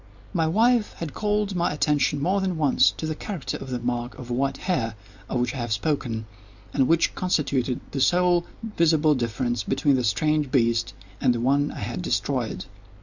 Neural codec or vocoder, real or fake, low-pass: none; real; 7.2 kHz